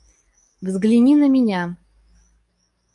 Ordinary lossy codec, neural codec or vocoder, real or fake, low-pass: MP3, 64 kbps; codec, 44.1 kHz, 7.8 kbps, DAC; fake; 10.8 kHz